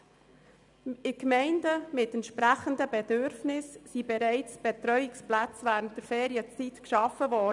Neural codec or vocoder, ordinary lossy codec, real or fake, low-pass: none; none; real; 10.8 kHz